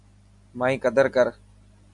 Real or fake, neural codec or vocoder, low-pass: real; none; 10.8 kHz